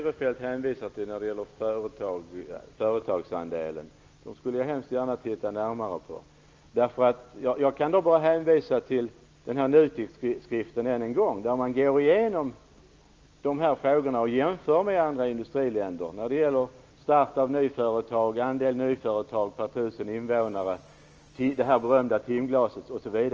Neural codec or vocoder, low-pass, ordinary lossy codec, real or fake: none; 7.2 kHz; Opus, 24 kbps; real